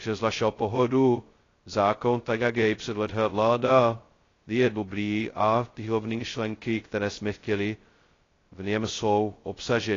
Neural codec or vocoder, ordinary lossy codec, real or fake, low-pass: codec, 16 kHz, 0.2 kbps, FocalCodec; AAC, 32 kbps; fake; 7.2 kHz